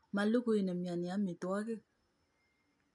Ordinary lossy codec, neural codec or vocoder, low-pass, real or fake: MP3, 64 kbps; none; 10.8 kHz; real